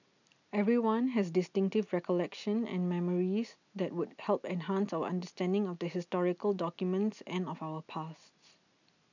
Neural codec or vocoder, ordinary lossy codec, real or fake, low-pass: none; none; real; 7.2 kHz